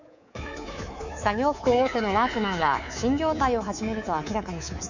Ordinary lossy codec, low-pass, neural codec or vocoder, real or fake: none; 7.2 kHz; codec, 24 kHz, 3.1 kbps, DualCodec; fake